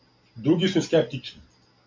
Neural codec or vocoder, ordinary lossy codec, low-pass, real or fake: none; MP3, 48 kbps; 7.2 kHz; real